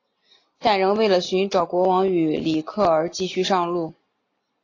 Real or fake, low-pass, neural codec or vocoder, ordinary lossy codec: real; 7.2 kHz; none; AAC, 32 kbps